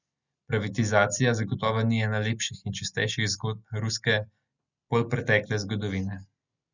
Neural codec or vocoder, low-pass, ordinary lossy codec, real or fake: none; 7.2 kHz; none; real